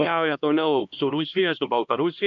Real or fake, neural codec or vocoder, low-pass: fake; codec, 16 kHz, 1 kbps, X-Codec, HuBERT features, trained on LibriSpeech; 7.2 kHz